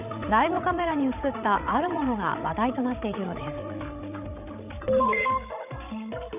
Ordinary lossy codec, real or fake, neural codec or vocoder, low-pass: none; fake; codec, 16 kHz, 16 kbps, FreqCodec, larger model; 3.6 kHz